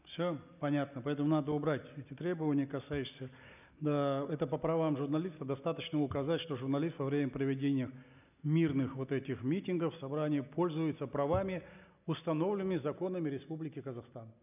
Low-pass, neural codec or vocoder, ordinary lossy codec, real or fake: 3.6 kHz; none; none; real